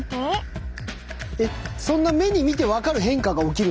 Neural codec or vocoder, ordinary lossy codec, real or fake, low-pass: none; none; real; none